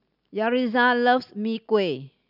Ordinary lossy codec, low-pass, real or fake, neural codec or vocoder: none; 5.4 kHz; real; none